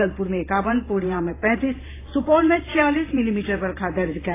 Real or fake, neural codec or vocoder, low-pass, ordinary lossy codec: fake; vocoder, 44.1 kHz, 128 mel bands every 512 samples, BigVGAN v2; 3.6 kHz; AAC, 16 kbps